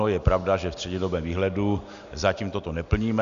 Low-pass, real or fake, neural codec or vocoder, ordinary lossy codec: 7.2 kHz; real; none; AAC, 96 kbps